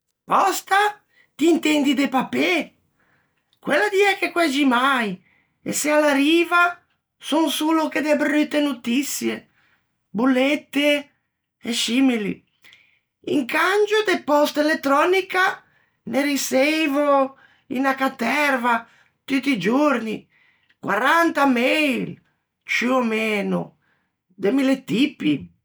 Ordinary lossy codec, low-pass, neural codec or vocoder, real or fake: none; none; none; real